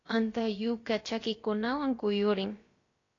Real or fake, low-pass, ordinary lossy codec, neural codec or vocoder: fake; 7.2 kHz; AAC, 32 kbps; codec, 16 kHz, about 1 kbps, DyCAST, with the encoder's durations